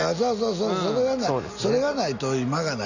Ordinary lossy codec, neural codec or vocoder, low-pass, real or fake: none; none; 7.2 kHz; real